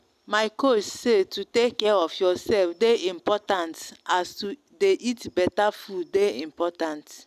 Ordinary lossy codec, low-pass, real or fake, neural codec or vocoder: none; 14.4 kHz; real; none